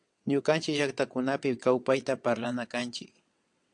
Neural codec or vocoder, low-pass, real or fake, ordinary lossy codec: vocoder, 22.05 kHz, 80 mel bands, WaveNeXt; 9.9 kHz; fake; AAC, 64 kbps